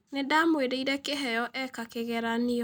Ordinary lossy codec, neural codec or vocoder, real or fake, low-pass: none; none; real; none